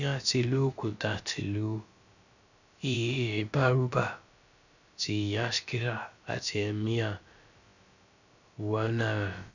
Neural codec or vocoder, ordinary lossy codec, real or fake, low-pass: codec, 16 kHz, about 1 kbps, DyCAST, with the encoder's durations; none; fake; 7.2 kHz